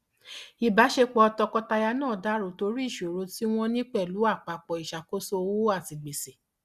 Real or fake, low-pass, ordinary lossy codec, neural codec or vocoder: real; 14.4 kHz; Opus, 64 kbps; none